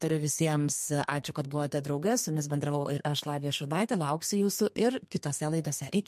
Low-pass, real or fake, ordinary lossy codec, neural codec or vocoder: 14.4 kHz; fake; MP3, 64 kbps; codec, 32 kHz, 1.9 kbps, SNAC